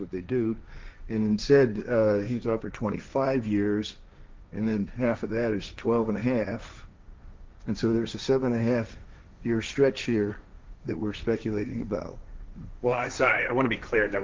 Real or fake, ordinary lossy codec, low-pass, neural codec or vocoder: fake; Opus, 24 kbps; 7.2 kHz; codec, 16 kHz, 1.1 kbps, Voila-Tokenizer